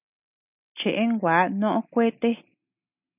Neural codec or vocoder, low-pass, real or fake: none; 3.6 kHz; real